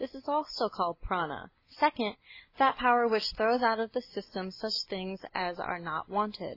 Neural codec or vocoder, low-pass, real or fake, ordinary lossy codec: none; 5.4 kHz; real; AAC, 32 kbps